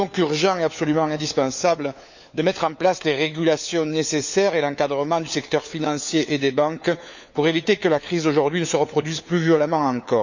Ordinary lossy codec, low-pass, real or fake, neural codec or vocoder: none; 7.2 kHz; fake; codec, 16 kHz, 4 kbps, FunCodec, trained on LibriTTS, 50 frames a second